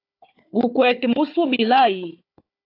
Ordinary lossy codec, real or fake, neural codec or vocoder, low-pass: AAC, 32 kbps; fake; codec, 16 kHz, 4 kbps, FunCodec, trained on Chinese and English, 50 frames a second; 5.4 kHz